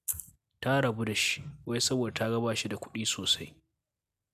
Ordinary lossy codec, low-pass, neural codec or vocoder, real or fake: MP3, 96 kbps; 14.4 kHz; none; real